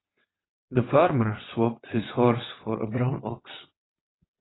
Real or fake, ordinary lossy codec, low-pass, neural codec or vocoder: fake; AAC, 16 kbps; 7.2 kHz; vocoder, 22.05 kHz, 80 mel bands, WaveNeXt